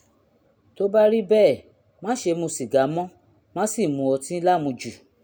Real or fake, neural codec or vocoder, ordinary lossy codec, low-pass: real; none; none; none